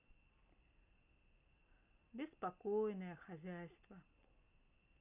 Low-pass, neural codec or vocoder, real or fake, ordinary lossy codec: 3.6 kHz; none; real; none